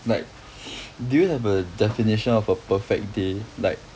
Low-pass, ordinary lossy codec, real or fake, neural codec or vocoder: none; none; real; none